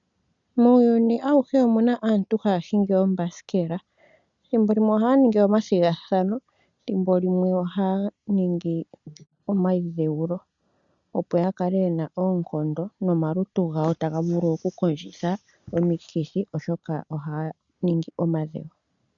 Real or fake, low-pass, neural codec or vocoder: real; 7.2 kHz; none